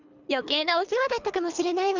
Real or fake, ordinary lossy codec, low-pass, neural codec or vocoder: fake; none; 7.2 kHz; codec, 24 kHz, 3 kbps, HILCodec